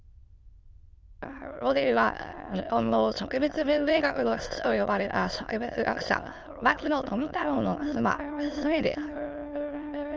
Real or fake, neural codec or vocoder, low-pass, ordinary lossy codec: fake; autoencoder, 22.05 kHz, a latent of 192 numbers a frame, VITS, trained on many speakers; 7.2 kHz; Opus, 24 kbps